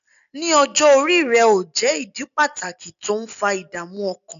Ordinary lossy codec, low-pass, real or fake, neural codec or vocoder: MP3, 96 kbps; 7.2 kHz; real; none